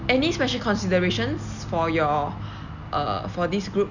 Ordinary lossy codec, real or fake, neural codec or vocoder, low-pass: none; real; none; 7.2 kHz